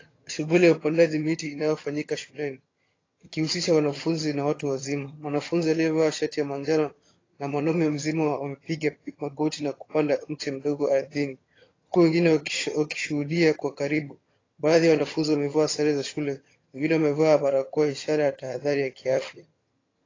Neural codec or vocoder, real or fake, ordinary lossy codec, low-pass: vocoder, 22.05 kHz, 80 mel bands, HiFi-GAN; fake; AAC, 32 kbps; 7.2 kHz